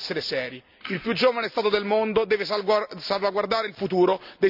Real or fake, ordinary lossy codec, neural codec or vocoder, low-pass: real; none; none; 5.4 kHz